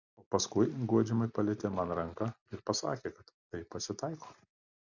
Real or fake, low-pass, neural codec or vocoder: real; 7.2 kHz; none